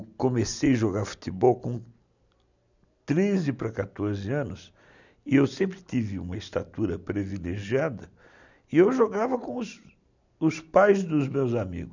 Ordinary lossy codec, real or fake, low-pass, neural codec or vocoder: none; real; 7.2 kHz; none